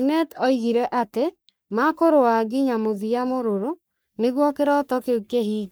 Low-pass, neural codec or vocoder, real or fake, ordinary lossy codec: none; codec, 44.1 kHz, 3.4 kbps, Pupu-Codec; fake; none